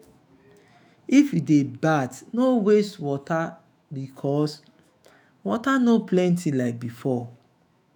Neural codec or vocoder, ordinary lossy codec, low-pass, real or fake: autoencoder, 48 kHz, 128 numbers a frame, DAC-VAE, trained on Japanese speech; none; none; fake